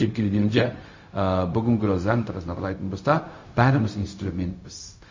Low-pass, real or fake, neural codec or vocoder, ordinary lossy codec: 7.2 kHz; fake; codec, 16 kHz, 0.4 kbps, LongCat-Audio-Codec; MP3, 32 kbps